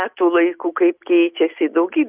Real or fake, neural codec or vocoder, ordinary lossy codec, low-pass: real; none; Opus, 24 kbps; 3.6 kHz